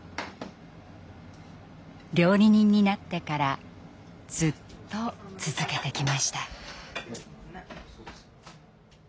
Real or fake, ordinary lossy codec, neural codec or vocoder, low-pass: real; none; none; none